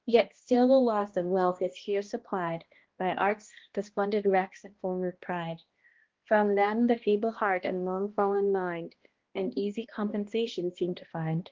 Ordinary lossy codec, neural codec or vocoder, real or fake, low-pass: Opus, 16 kbps; codec, 16 kHz, 1 kbps, X-Codec, HuBERT features, trained on balanced general audio; fake; 7.2 kHz